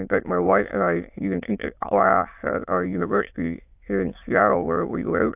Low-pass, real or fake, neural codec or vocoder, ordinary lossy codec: 3.6 kHz; fake; autoencoder, 22.05 kHz, a latent of 192 numbers a frame, VITS, trained on many speakers; AAC, 32 kbps